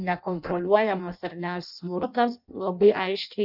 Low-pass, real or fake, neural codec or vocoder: 5.4 kHz; fake; codec, 16 kHz in and 24 kHz out, 0.6 kbps, FireRedTTS-2 codec